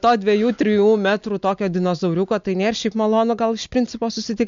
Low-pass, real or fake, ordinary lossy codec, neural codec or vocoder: 7.2 kHz; real; MP3, 64 kbps; none